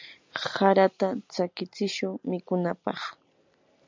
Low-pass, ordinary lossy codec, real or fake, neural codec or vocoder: 7.2 kHz; MP3, 64 kbps; real; none